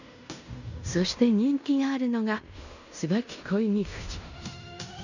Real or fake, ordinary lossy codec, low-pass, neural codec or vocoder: fake; none; 7.2 kHz; codec, 16 kHz in and 24 kHz out, 0.9 kbps, LongCat-Audio-Codec, fine tuned four codebook decoder